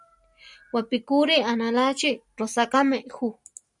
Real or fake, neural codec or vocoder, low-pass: real; none; 10.8 kHz